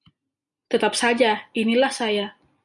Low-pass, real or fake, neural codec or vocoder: 10.8 kHz; real; none